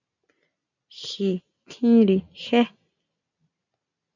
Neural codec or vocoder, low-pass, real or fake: none; 7.2 kHz; real